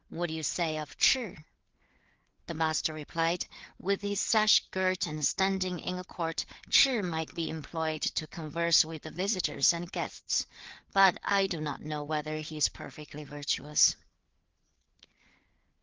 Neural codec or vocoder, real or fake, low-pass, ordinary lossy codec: codec, 16 kHz, 4 kbps, FreqCodec, larger model; fake; 7.2 kHz; Opus, 16 kbps